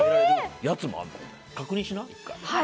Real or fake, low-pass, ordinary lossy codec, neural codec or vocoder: real; none; none; none